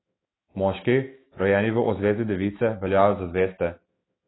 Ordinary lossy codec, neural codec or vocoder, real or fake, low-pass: AAC, 16 kbps; autoencoder, 48 kHz, 128 numbers a frame, DAC-VAE, trained on Japanese speech; fake; 7.2 kHz